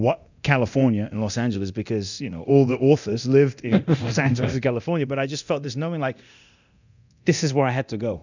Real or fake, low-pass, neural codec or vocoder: fake; 7.2 kHz; codec, 24 kHz, 0.9 kbps, DualCodec